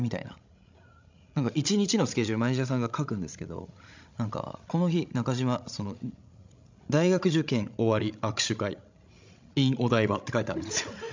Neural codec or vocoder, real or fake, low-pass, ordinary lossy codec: codec, 16 kHz, 16 kbps, FreqCodec, larger model; fake; 7.2 kHz; MP3, 64 kbps